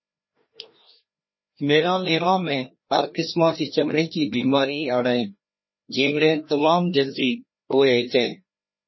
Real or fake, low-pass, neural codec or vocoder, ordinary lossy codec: fake; 7.2 kHz; codec, 16 kHz, 1 kbps, FreqCodec, larger model; MP3, 24 kbps